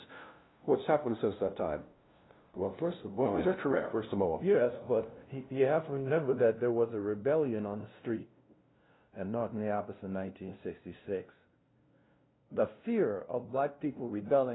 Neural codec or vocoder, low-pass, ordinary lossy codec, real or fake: codec, 16 kHz, 0.5 kbps, FunCodec, trained on LibriTTS, 25 frames a second; 7.2 kHz; AAC, 16 kbps; fake